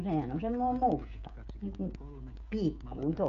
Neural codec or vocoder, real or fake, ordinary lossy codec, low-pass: codec, 16 kHz, 16 kbps, FreqCodec, smaller model; fake; none; 7.2 kHz